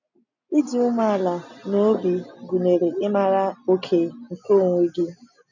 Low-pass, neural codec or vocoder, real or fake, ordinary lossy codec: 7.2 kHz; none; real; none